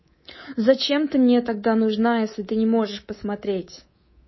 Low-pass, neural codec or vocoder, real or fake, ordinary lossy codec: 7.2 kHz; codec, 24 kHz, 3.1 kbps, DualCodec; fake; MP3, 24 kbps